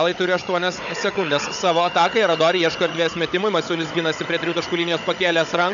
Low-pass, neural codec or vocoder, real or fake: 7.2 kHz; codec, 16 kHz, 16 kbps, FunCodec, trained on Chinese and English, 50 frames a second; fake